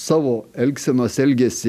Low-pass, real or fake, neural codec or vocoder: 14.4 kHz; real; none